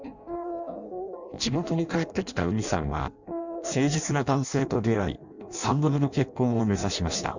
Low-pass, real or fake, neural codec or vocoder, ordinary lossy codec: 7.2 kHz; fake; codec, 16 kHz in and 24 kHz out, 0.6 kbps, FireRedTTS-2 codec; none